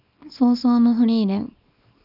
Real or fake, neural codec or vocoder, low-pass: fake; codec, 24 kHz, 0.9 kbps, WavTokenizer, small release; 5.4 kHz